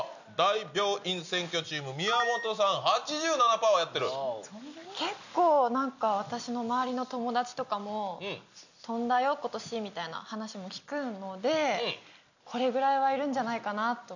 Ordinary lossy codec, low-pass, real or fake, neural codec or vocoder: none; 7.2 kHz; real; none